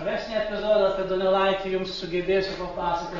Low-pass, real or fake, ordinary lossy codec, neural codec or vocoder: 7.2 kHz; real; AAC, 24 kbps; none